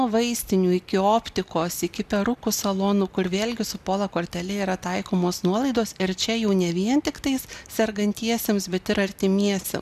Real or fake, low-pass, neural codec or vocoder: real; 14.4 kHz; none